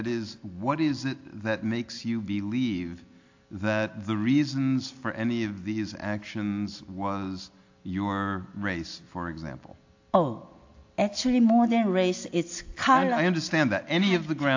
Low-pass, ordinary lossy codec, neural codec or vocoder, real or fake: 7.2 kHz; AAC, 48 kbps; none; real